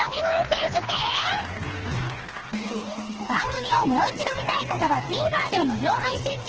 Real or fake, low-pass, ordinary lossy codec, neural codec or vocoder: fake; 7.2 kHz; Opus, 16 kbps; codec, 16 kHz, 2 kbps, FreqCodec, smaller model